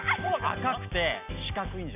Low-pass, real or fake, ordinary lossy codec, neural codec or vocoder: 3.6 kHz; real; none; none